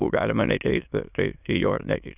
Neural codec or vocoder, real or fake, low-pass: autoencoder, 22.05 kHz, a latent of 192 numbers a frame, VITS, trained on many speakers; fake; 3.6 kHz